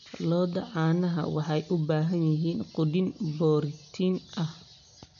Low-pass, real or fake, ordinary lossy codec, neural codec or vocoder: 7.2 kHz; real; none; none